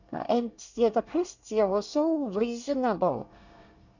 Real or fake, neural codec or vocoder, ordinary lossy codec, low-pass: fake; codec, 24 kHz, 1 kbps, SNAC; none; 7.2 kHz